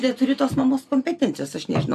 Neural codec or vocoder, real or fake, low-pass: none; real; 14.4 kHz